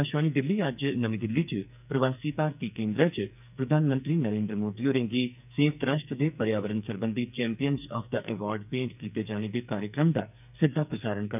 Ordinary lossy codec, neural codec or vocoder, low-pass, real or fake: none; codec, 44.1 kHz, 2.6 kbps, SNAC; 3.6 kHz; fake